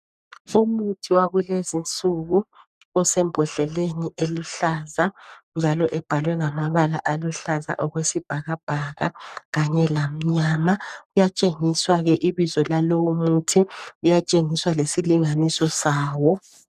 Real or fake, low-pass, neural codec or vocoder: fake; 14.4 kHz; codec, 44.1 kHz, 3.4 kbps, Pupu-Codec